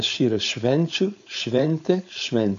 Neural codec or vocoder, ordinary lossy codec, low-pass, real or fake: codec, 16 kHz, 4.8 kbps, FACodec; AAC, 64 kbps; 7.2 kHz; fake